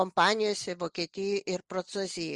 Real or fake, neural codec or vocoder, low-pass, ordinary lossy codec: real; none; 10.8 kHz; Opus, 32 kbps